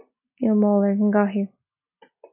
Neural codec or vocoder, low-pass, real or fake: none; 3.6 kHz; real